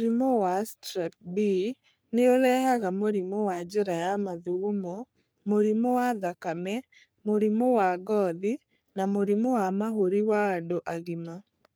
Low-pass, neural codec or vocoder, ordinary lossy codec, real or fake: none; codec, 44.1 kHz, 3.4 kbps, Pupu-Codec; none; fake